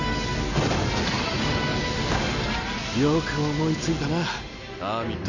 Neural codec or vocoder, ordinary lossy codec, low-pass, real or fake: none; none; 7.2 kHz; real